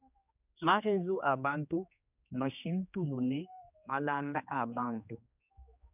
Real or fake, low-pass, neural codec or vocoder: fake; 3.6 kHz; codec, 16 kHz, 2 kbps, X-Codec, HuBERT features, trained on general audio